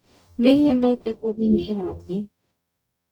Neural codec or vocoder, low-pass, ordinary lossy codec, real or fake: codec, 44.1 kHz, 0.9 kbps, DAC; 19.8 kHz; none; fake